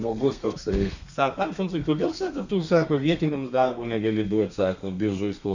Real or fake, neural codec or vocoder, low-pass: fake; codec, 32 kHz, 1.9 kbps, SNAC; 7.2 kHz